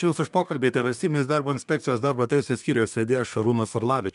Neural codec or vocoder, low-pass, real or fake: codec, 24 kHz, 1 kbps, SNAC; 10.8 kHz; fake